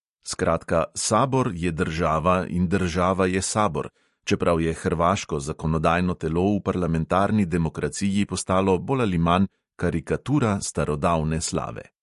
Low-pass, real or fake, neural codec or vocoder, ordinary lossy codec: 14.4 kHz; real; none; MP3, 48 kbps